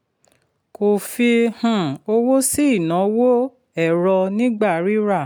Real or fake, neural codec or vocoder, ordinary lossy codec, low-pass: real; none; none; none